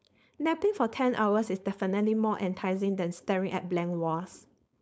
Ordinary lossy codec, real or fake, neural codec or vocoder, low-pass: none; fake; codec, 16 kHz, 4.8 kbps, FACodec; none